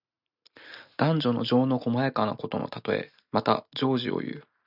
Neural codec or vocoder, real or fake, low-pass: none; real; 5.4 kHz